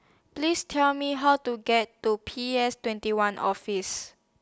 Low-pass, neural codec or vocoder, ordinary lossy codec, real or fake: none; none; none; real